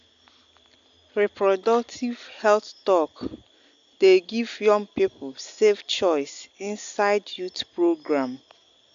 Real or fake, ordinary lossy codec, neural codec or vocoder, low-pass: real; AAC, 64 kbps; none; 7.2 kHz